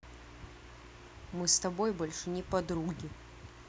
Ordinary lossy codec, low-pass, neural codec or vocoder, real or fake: none; none; none; real